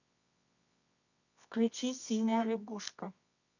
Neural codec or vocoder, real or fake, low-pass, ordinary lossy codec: codec, 24 kHz, 0.9 kbps, WavTokenizer, medium music audio release; fake; 7.2 kHz; none